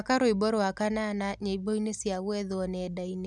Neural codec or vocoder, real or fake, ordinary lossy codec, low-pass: none; real; none; none